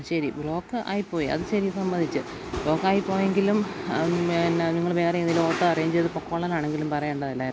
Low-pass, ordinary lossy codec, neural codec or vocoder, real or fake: none; none; none; real